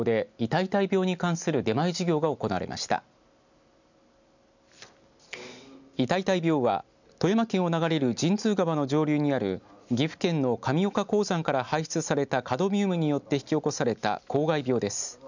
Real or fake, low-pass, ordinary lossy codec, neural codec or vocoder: real; 7.2 kHz; none; none